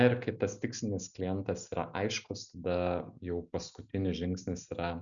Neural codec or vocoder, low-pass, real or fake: none; 7.2 kHz; real